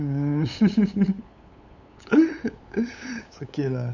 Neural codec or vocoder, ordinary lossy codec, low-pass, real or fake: codec, 16 kHz, 8 kbps, FunCodec, trained on LibriTTS, 25 frames a second; none; 7.2 kHz; fake